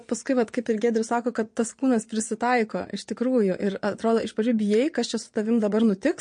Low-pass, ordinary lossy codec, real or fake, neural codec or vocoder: 9.9 kHz; MP3, 48 kbps; fake; vocoder, 22.05 kHz, 80 mel bands, Vocos